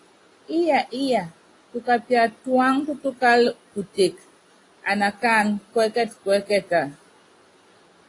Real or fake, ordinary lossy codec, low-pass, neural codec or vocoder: real; AAC, 32 kbps; 10.8 kHz; none